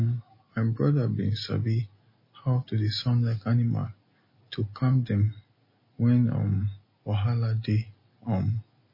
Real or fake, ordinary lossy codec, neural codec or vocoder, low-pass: real; MP3, 24 kbps; none; 5.4 kHz